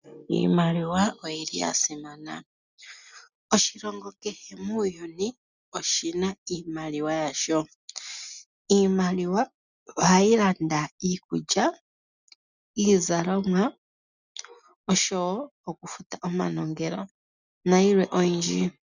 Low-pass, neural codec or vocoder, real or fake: 7.2 kHz; none; real